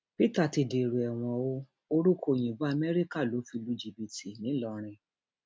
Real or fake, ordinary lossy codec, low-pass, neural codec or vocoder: real; none; none; none